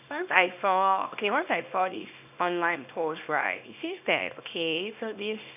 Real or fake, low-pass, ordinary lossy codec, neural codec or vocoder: fake; 3.6 kHz; none; codec, 24 kHz, 0.9 kbps, WavTokenizer, small release